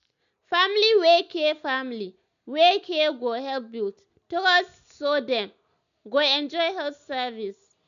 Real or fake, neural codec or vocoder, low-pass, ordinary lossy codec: real; none; 7.2 kHz; none